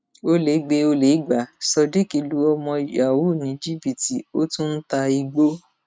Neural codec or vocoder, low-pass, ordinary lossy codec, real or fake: none; none; none; real